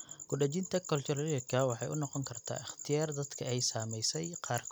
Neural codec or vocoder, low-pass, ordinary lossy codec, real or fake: none; none; none; real